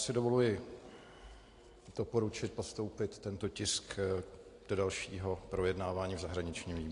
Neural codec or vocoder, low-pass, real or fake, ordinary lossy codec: none; 10.8 kHz; real; AAC, 48 kbps